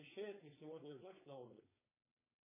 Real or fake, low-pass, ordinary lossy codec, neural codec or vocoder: fake; 3.6 kHz; MP3, 16 kbps; codec, 16 kHz, 4.8 kbps, FACodec